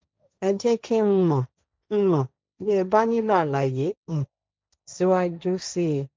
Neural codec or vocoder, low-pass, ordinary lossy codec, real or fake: codec, 16 kHz, 1.1 kbps, Voila-Tokenizer; none; none; fake